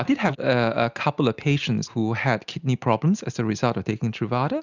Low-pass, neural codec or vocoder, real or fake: 7.2 kHz; none; real